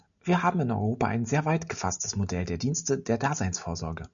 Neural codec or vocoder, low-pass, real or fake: none; 7.2 kHz; real